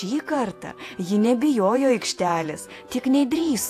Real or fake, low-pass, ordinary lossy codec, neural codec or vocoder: fake; 14.4 kHz; AAC, 64 kbps; vocoder, 48 kHz, 128 mel bands, Vocos